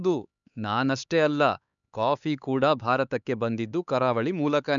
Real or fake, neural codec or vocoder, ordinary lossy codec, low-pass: fake; codec, 16 kHz, 4 kbps, X-Codec, HuBERT features, trained on LibriSpeech; none; 7.2 kHz